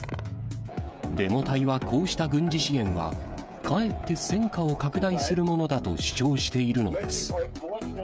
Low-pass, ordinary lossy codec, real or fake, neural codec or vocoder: none; none; fake; codec, 16 kHz, 16 kbps, FreqCodec, smaller model